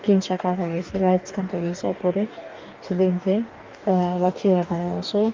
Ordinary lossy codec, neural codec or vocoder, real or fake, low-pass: Opus, 24 kbps; codec, 44.1 kHz, 2.6 kbps, DAC; fake; 7.2 kHz